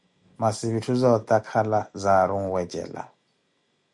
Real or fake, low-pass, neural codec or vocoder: real; 10.8 kHz; none